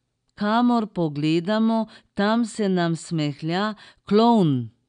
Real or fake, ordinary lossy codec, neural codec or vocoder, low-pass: real; none; none; 9.9 kHz